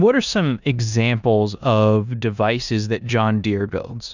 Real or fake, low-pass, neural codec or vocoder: fake; 7.2 kHz; codec, 16 kHz in and 24 kHz out, 0.9 kbps, LongCat-Audio-Codec, four codebook decoder